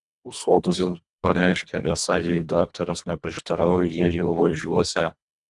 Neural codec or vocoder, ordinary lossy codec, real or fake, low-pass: codec, 24 kHz, 1.5 kbps, HILCodec; Opus, 64 kbps; fake; 10.8 kHz